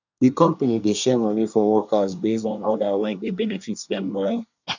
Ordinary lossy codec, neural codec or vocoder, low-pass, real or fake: none; codec, 24 kHz, 1 kbps, SNAC; 7.2 kHz; fake